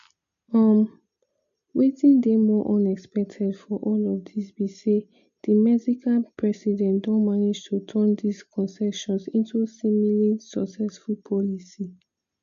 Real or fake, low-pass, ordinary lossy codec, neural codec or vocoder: real; 7.2 kHz; none; none